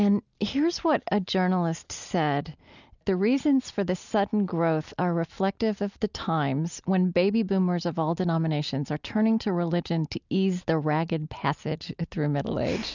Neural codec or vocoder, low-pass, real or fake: none; 7.2 kHz; real